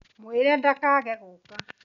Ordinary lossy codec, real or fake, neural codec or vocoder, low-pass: none; real; none; 7.2 kHz